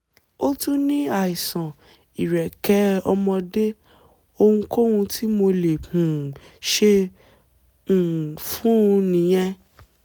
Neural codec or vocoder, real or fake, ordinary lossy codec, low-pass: none; real; none; none